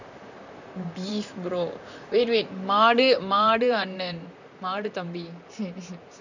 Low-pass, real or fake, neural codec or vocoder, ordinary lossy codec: 7.2 kHz; fake; vocoder, 44.1 kHz, 128 mel bands, Pupu-Vocoder; none